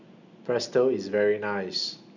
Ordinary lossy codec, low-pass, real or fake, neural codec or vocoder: none; 7.2 kHz; real; none